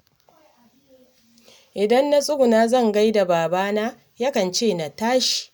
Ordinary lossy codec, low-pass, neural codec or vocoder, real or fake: none; none; none; real